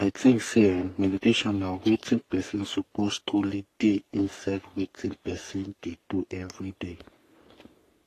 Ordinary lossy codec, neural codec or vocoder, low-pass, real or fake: AAC, 48 kbps; codec, 44.1 kHz, 3.4 kbps, Pupu-Codec; 14.4 kHz; fake